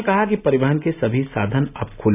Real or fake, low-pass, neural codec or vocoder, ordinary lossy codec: real; 3.6 kHz; none; none